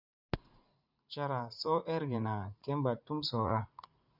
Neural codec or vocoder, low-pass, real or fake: vocoder, 44.1 kHz, 80 mel bands, Vocos; 5.4 kHz; fake